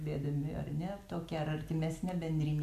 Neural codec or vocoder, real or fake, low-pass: none; real; 14.4 kHz